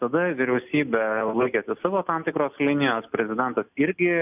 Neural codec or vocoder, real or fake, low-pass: none; real; 3.6 kHz